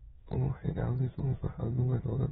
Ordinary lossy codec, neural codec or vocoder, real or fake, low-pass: AAC, 16 kbps; autoencoder, 22.05 kHz, a latent of 192 numbers a frame, VITS, trained on many speakers; fake; 9.9 kHz